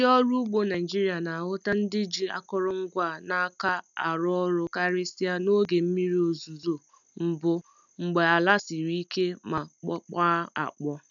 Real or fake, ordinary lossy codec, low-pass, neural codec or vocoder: real; none; 7.2 kHz; none